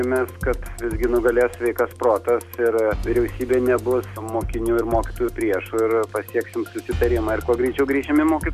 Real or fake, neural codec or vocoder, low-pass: real; none; 14.4 kHz